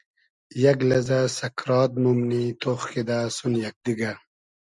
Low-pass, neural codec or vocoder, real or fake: 10.8 kHz; none; real